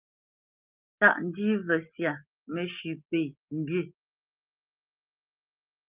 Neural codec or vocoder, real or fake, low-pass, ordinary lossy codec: none; real; 3.6 kHz; Opus, 32 kbps